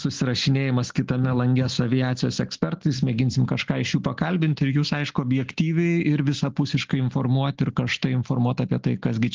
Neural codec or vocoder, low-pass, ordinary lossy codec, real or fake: none; 7.2 kHz; Opus, 16 kbps; real